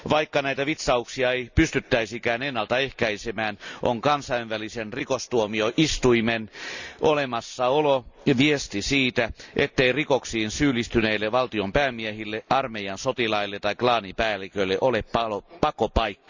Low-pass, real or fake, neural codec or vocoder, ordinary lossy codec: 7.2 kHz; real; none; Opus, 64 kbps